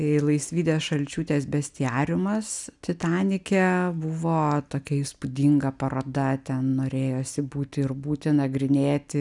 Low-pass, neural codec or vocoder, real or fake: 10.8 kHz; none; real